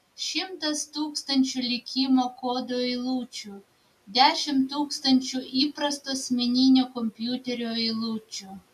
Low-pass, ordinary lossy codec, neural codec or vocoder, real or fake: 14.4 kHz; AAC, 96 kbps; none; real